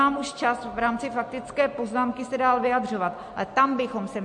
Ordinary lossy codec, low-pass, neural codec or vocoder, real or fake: MP3, 48 kbps; 10.8 kHz; none; real